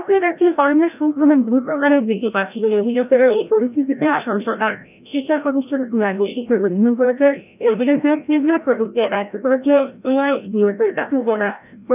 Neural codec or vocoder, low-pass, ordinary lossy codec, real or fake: codec, 16 kHz, 0.5 kbps, FreqCodec, larger model; 3.6 kHz; none; fake